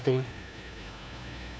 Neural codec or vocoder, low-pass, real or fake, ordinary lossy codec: codec, 16 kHz, 1 kbps, FunCodec, trained on LibriTTS, 50 frames a second; none; fake; none